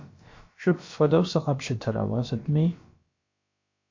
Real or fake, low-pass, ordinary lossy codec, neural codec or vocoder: fake; 7.2 kHz; MP3, 48 kbps; codec, 16 kHz, about 1 kbps, DyCAST, with the encoder's durations